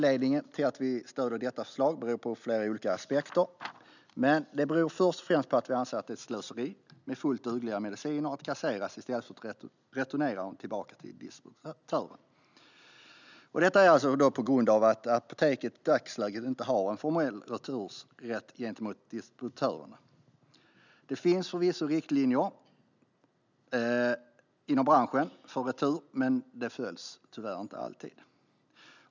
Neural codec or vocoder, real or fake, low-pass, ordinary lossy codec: none; real; 7.2 kHz; none